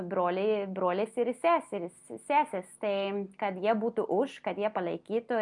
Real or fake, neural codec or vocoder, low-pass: real; none; 10.8 kHz